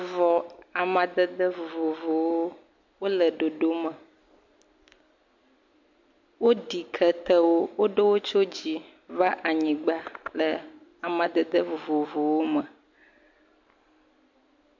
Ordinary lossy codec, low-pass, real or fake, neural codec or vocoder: MP3, 48 kbps; 7.2 kHz; real; none